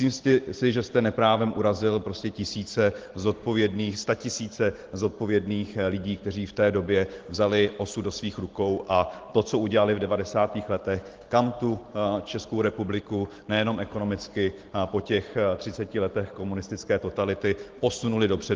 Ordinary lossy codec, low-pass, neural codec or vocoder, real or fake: Opus, 16 kbps; 7.2 kHz; none; real